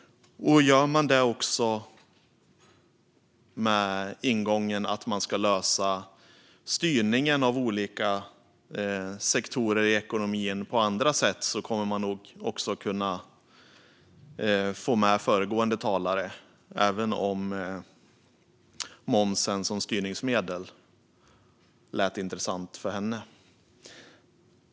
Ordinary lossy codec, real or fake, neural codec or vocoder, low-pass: none; real; none; none